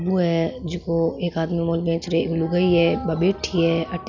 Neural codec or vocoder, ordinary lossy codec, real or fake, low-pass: none; none; real; 7.2 kHz